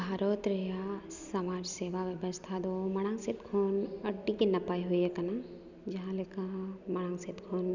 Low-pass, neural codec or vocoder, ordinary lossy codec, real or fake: 7.2 kHz; none; none; real